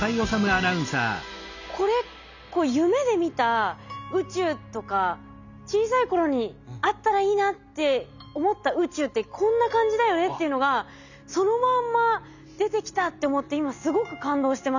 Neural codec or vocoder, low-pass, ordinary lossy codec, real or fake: none; 7.2 kHz; none; real